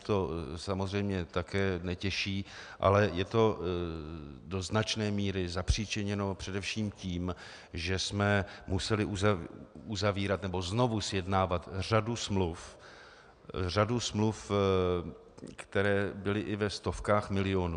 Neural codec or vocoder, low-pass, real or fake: none; 9.9 kHz; real